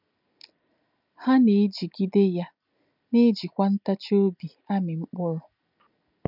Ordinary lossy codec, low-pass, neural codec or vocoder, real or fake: none; 5.4 kHz; none; real